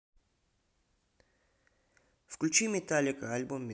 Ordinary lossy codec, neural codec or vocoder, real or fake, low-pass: none; none; real; none